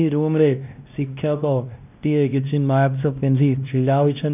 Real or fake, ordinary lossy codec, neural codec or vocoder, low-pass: fake; none; codec, 16 kHz, 1 kbps, X-Codec, WavLM features, trained on Multilingual LibriSpeech; 3.6 kHz